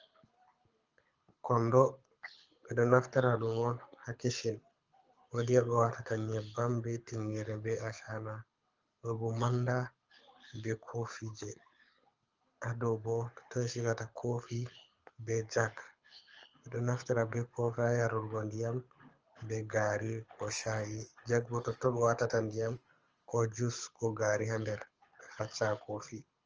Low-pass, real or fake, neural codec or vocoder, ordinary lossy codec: 7.2 kHz; fake; codec, 24 kHz, 6 kbps, HILCodec; Opus, 24 kbps